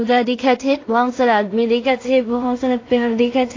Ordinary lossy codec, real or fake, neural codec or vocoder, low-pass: AAC, 32 kbps; fake; codec, 16 kHz in and 24 kHz out, 0.4 kbps, LongCat-Audio-Codec, two codebook decoder; 7.2 kHz